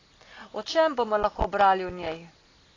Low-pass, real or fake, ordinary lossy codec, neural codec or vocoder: 7.2 kHz; real; AAC, 32 kbps; none